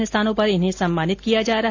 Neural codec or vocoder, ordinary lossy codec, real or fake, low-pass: none; AAC, 48 kbps; real; 7.2 kHz